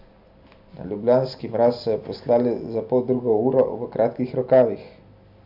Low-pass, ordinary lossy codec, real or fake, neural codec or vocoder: 5.4 kHz; none; real; none